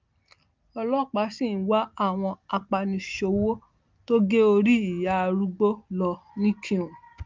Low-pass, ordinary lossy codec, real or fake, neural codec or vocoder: 7.2 kHz; Opus, 24 kbps; real; none